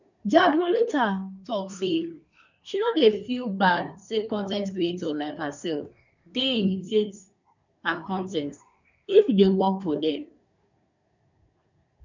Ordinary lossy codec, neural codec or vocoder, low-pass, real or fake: none; codec, 24 kHz, 1 kbps, SNAC; 7.2 kHz; fake